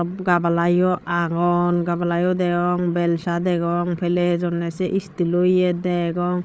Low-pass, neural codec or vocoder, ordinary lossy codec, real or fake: none; codec, 16 kHz, 8 kbps, FreqCodec, larger model; none; fake